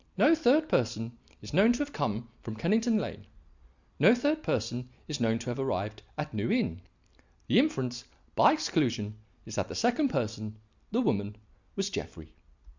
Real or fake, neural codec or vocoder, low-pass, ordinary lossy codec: real; none; 7.2 kHz; Opus, 64 kbps